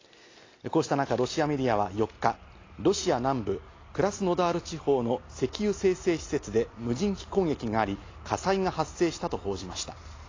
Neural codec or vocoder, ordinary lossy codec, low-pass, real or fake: none; AAC, 32 kbps; 7.2 kHz; real